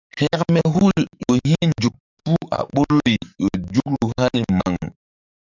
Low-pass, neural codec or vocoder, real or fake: 7.2 kHz; codec, 44.1 kHz, 7.8 kbps, DAC; fake